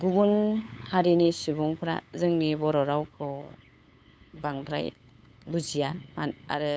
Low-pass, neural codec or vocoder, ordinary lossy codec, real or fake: none; codec, 16 kHz, 8 kbps, FunCodec, trained on LibriTTS, 25 frames a second; none; fake